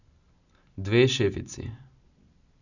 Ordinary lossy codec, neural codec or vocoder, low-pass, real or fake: none; none; 7.2 kHz; real